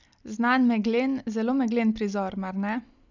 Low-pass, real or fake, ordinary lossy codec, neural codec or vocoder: 7.2 kHz; real; none; none